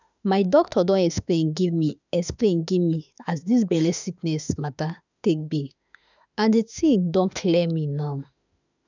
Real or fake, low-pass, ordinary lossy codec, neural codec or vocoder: fake; 7.2 kHz; none; autoencoder, 48 kHz, 32 numbers a frame, DAC-VAE, trained on Japanese speech